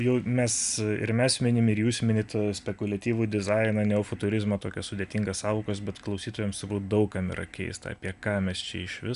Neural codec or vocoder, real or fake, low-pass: none; real; 10.8 kHz